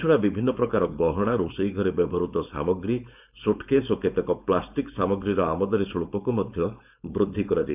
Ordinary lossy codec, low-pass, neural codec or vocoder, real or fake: none; 3.6 kHz; codec, 16 kHz, 4.8 kbps, FACodec; fake